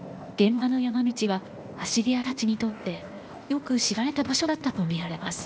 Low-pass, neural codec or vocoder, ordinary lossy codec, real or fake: none; codec, 16 kHz, 0.8 kbps, ZipCodec; none; fake